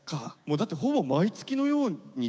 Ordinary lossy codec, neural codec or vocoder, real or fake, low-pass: none; codec, 16 kHz, 6 kbps, DAC; fake; none